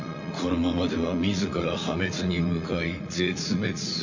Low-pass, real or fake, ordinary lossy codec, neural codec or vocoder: 7.2 kHz; fake; Opus, 64 kbps; vocoder, 44.1 kHz, 80 mel bands, Vocos